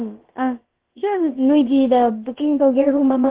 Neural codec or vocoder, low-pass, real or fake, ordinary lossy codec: codec, 16 kHz, about 1 kbps, DyCAST, with the encoder's durations; 3.6 kHz; fake; Opus, 16 kbps